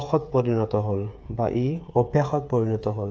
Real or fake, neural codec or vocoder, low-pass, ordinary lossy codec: fake; codec, 16 kHz, 8 kbps, FreqCodec, smaller model; none; none